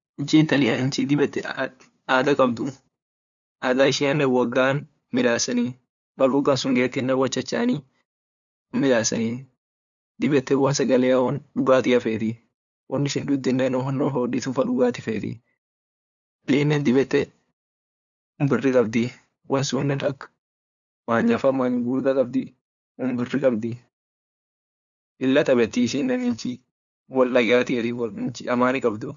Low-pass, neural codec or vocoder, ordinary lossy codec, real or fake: 7.2 kHz; codec, 16 kHz, 2 kbps, FunCodec, trained on LibriTTS, 25 frames a second; none; fake